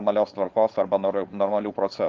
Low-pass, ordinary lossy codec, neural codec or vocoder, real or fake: 7.2 kHz; Opus, 24 kbps; codec, 16 kHz, 4.8 kbps, FACodec; fake